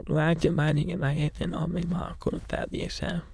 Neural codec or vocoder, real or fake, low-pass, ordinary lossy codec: autoencoder, 22.05 kHz, a latent of 192 numbers a frame, VITS, trained on many speakers; fake; none; none